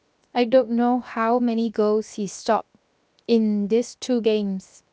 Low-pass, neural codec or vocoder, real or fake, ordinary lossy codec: none; codec, 16 kHz, 0.7 kbps, FocalCodec; fake; none